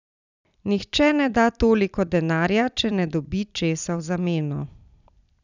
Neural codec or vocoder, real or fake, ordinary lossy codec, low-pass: vocoder, 44.1 kHz, 128 mel bands every 256 samples, BigVGAN v2; fake; none; 7.2 kHz